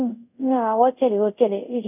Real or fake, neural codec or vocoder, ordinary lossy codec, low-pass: fake; codec, 24 kHz, 0.5 kbps, DualCodec; none; 3.6 kHz